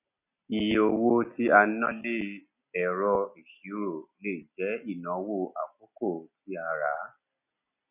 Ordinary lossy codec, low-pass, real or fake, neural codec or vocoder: none; 3.6 kHz; real; none